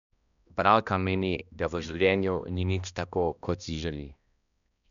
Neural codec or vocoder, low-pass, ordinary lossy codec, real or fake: codec, 16 kHz, 1 kbps, X-Codec, HuBERT features, trained on balanced general audio; 7.2 kHz; none; fake